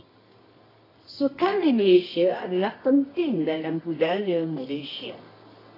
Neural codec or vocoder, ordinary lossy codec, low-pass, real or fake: codec, 24 kHz, 0.9 kbps, WavTokenizer, medium music audio release; AAC, 24 kbps; 5.4 kHz; fake